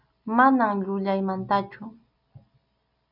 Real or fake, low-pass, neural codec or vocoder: real; 5.4 kHz; none